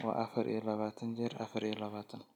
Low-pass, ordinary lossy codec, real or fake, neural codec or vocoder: 19.8 kHz; none; real; none